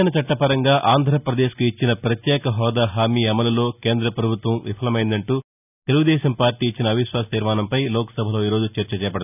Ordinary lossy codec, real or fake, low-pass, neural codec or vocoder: none; real; 3.6 kHz; none